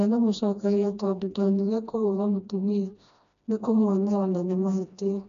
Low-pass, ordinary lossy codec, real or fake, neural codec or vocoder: 7.2 kHz; none; fake; codec, 16 kHz, 1 kbps, FreqCodec, smaller model